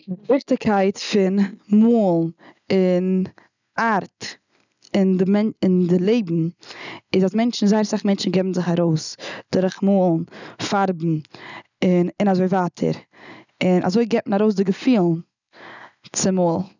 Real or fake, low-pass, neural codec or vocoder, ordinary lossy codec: real; 7.2 kHz; none; none